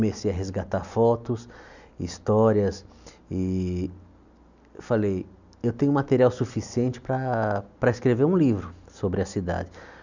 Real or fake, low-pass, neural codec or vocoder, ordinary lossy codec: real; 7.2 kHz; none; none